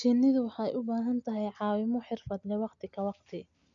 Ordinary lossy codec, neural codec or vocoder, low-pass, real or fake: MP3, 96 kbps; none; 7.2 kHz; real